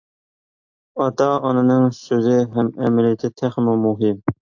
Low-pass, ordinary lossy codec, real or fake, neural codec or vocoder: 7.2 kHz; AAC, 48 kbps; real; none